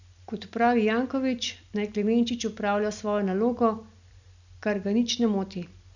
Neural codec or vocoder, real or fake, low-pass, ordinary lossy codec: none; real; 7.2 kHz; none